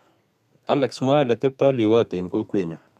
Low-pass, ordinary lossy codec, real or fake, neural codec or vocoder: 14.4 kHz; none; fake; codec, 32 kHz, 1.9 kbps, SNAC